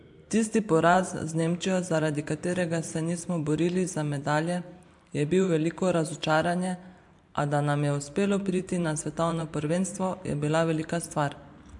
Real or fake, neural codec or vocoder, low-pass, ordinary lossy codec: fake; vocoder, 44.1 kHz, 128 mel bands every 512 samples, BigVGAN v2; 10.8 kHz; MP3, 64 kbps